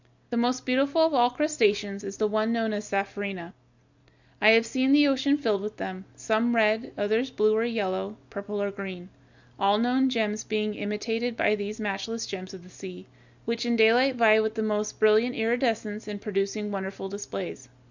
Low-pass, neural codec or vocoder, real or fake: 7.2 kHz; none; real